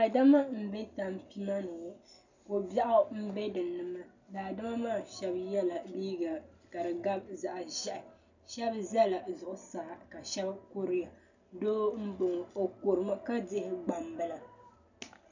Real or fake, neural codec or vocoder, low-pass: real; none; 7.2 kHz